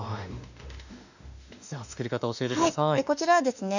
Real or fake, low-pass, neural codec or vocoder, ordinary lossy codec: fake; 7.2 kHz; autoencoder, 48 kHz, 32 numbers a frame, DAC-VAE, trained on Japanese speech; none